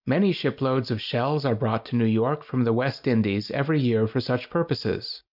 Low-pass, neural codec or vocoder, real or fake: 5.4 kHz; none; real